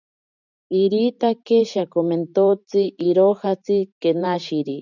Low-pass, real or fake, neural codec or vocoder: 7.2 kHz; fake; vocoder, 44.1 kHz, 128 mel bands every 512 samples, BigVGAN v2